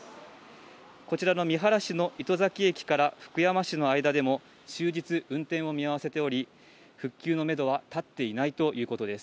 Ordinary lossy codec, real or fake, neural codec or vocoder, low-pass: none; real; none; none